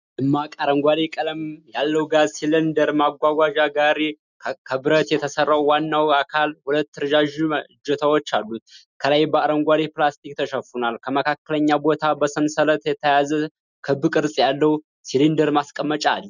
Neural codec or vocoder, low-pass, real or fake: none; 7.2 kHz; real